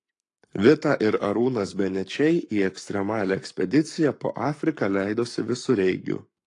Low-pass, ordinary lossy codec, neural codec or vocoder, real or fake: 10.8 kHz; AAC, 48 kbps; codec, 44.1 kHz, 7.8 kbps, Pupu-Codec; fake